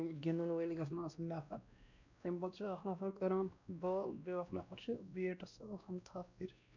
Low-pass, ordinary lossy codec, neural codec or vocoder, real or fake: 7.2 kHz; none; codec, 16 kHz, 1 kbps, X-Codec, WavLM features, trained on Multilingual LibriSpeech; fake